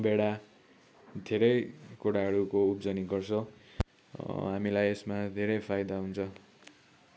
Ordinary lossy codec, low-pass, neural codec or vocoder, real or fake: none; none; none; real